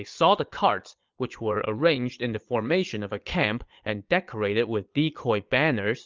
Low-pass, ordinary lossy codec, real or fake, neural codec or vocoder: 7.2 kHz; Opus, 24 kbps; fake; vocoder, 22.05 kHz, 80 mel bands, Vocos